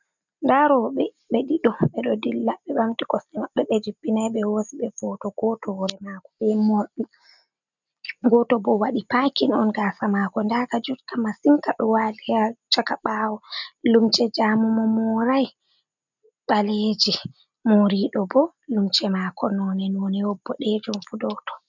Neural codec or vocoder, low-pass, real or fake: none; 7.2 kHz; real